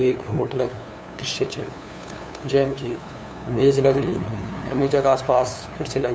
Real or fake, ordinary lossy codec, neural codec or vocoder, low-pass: fake; none; codec, 16 kHz, 2 kbps, FunCodec, trained on LibriTTS, 25 frames a second; none